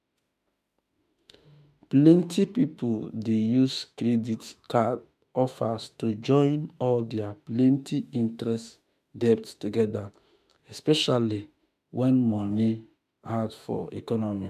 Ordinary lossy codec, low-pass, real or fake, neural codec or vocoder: none; 14.4 kHz; fake; autoencoder, 48 kHz, 32 numbers a frame, DAC-VAE, trained on Japanese speech